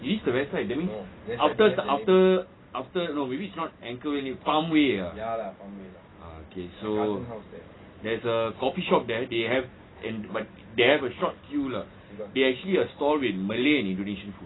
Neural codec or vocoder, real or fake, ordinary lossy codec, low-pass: none; real; AAC, 16 kbps; 7.2 kHz